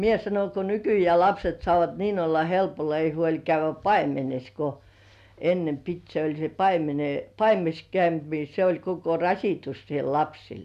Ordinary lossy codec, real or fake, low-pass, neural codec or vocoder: none; real; 14.4 kHz; none